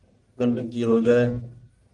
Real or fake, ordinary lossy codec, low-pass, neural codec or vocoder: fake; Opus, 24 kbps; 10.8 kHz; codec, 44.1 kHz, 1.7 kbps, Pupu-Codec